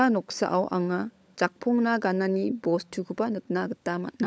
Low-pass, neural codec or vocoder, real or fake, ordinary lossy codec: none; codec, 16 kHz, 16 kbps, FunCodec, trained on LibriTTS, 50 frames a second; fake; none